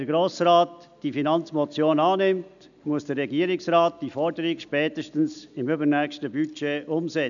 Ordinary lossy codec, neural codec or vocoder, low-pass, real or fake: none; none; 7.2 kHz; real